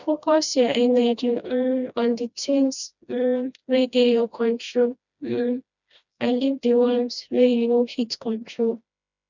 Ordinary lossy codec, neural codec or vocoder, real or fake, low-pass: none; codec, 16 kHz, 1 kbps, FreqCodec, smaller model; fake; 7.2 kHz